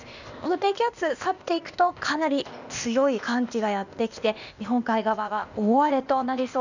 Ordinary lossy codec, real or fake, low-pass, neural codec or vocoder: none; fake; 7.2 kHz; codec, 16 kHz, 0.8 kbps, ZipCodec